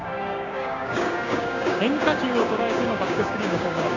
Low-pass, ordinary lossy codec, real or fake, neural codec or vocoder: 7.2 kHz; none; fake; codec, 16 kHz, 6 kbps, DAC